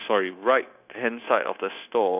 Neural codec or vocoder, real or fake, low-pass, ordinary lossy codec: codec, 16 kHz, 0.9 kbps, LongCat-Audio-Codec; fake; 3.6 kHz; none